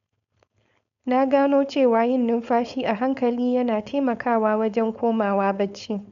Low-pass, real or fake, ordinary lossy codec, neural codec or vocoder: 7.2 kHz; fake; none; codec, 16 kHz, 4.8 kbps, FACodec